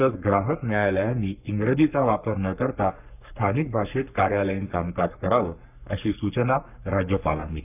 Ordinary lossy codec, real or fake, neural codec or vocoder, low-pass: none; fake; codec, 44.1 kHz, 3.4 kbps, Pupu-Codec; 3.6 kHz